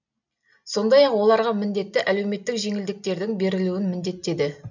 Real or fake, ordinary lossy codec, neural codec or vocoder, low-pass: real; none; none; 7.2 kHz